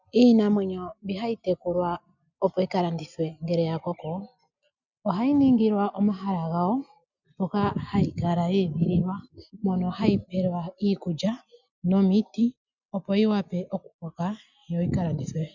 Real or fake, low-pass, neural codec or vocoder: real; 7.2 kHz; none